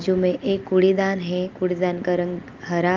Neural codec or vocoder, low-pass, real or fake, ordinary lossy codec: none; 7.2 kHz; real; Opus, 24 kbps